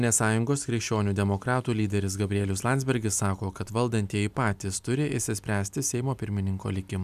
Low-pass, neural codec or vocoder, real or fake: 14.4 kHz; none; real